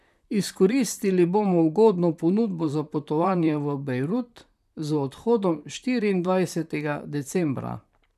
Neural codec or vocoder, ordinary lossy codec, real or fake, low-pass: vocoder, 44.1 kHz, 128 mel bands, Pupu-Vocoder; none; fake; 14.4 kHz